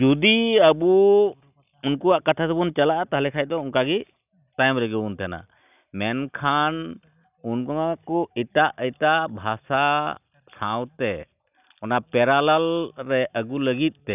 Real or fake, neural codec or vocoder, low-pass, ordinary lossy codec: real; none; 3.6 kHz; none